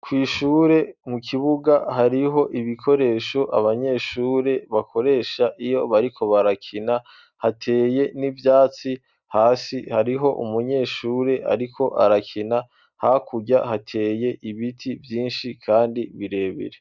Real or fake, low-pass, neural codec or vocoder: real; 7.2 kHz; none